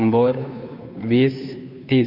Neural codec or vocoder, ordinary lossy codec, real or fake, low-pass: codec, 16 kHz, 2 kbps, FunCodec, trained on Chinese and English, 25 frames a second; none; fake; 5.4 kHz